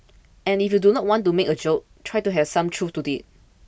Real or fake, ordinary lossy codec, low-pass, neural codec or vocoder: real; none; none; none